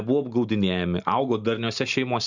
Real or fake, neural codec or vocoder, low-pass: real; none; 7.2 kHz